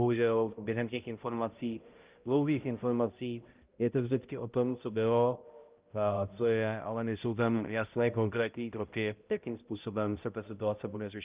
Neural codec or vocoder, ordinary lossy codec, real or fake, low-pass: codec, 16 kHz, 0.5 kbps, X-Codec, HuBERT features, trained on balanced general audio; Opus, 32 kbps; fake; 3.6 kHz